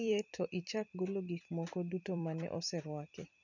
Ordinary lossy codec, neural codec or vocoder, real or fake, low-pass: none; vocoder, 44.1 kHz, 128 mel bands every 256 samples, BigVGAN v2; fake; 7.2 kHz